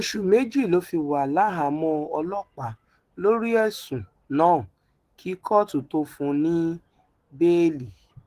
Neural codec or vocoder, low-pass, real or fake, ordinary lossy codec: autoencoder, 48 kHz, 128 numbers a frame, DAC-VAE, trained on Japanese speech; 14.4 kHz; fake; Opus, 16 kbps